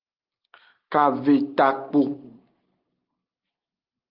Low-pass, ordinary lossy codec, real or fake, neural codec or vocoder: 5.4 kHz; Opus, 32 kbps; real; none